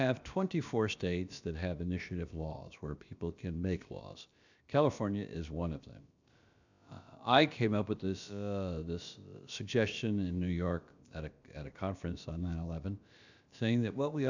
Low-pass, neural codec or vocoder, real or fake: 7.2 kHz; codec, 16 kHz, about 1 kbps, DyCAST, with the encoder's durations; fake